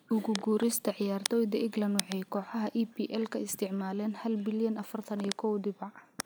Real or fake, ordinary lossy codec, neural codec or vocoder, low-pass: real; none; none; none